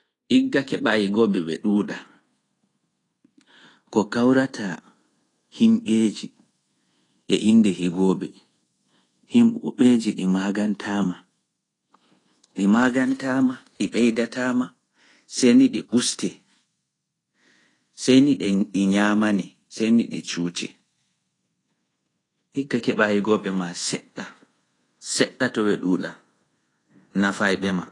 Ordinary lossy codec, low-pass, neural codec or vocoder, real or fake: AAC, 32 kbps; 10.8 kHz; codec, 24 kHz, 1.2 kbps, DualCodec; fake